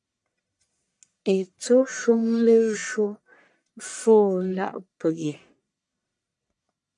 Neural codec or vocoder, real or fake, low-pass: codec, 44.1 kHz, 1.7 kbps, Pupu-Codec; fake; 10.8 kHz